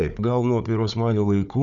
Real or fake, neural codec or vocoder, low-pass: fake; codec, 16 kHz, 4 kbps, FunCodec, trained on Chinese and English, 50 frames a second; 7.2 kHz